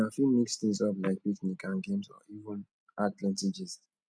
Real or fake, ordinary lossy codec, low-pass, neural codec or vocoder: real; none; none; none